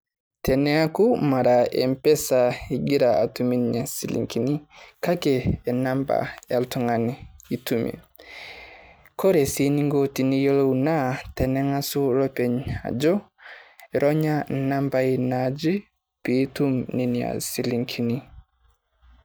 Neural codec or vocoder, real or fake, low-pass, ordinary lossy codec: none; real; none; none